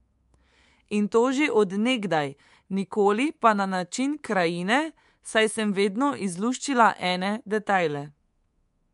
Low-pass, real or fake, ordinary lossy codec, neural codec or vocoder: 10.8 kHz; fake; MP3, 64 kbps; codec, 24 kHz, 3.1 kbps, DualCodec